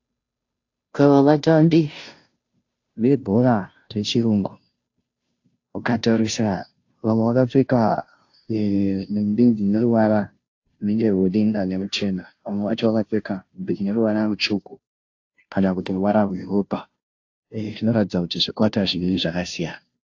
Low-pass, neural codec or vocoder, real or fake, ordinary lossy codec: 7.2 kHz; codec, 16 kHz, 0.5 kbps, FunCodec, trained on Chinese and English, 25 frames a second; fake; AAC, 48 kbps